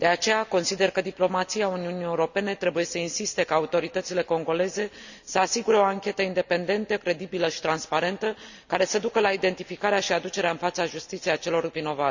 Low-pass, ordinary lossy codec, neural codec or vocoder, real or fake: 7.2 kHz; none; none; real